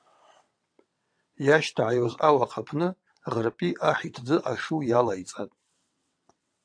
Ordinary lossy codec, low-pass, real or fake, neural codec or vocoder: AAC, 64 kbps; 9.9 kHz; fake; vocoder, 22.05 kHz, 80 mel bands, WaveNeXt